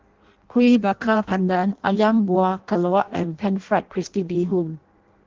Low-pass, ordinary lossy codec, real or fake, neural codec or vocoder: 7.2 kHz; Opus, 16 kbps; fake; codec, 16 kHz in and 24 kHz out, 0.6 kbps, FireRedTTS-2 codec